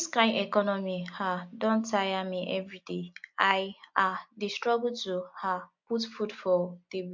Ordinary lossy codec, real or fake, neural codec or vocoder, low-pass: MP3, 48 kbps; real; none; 7.2 kHz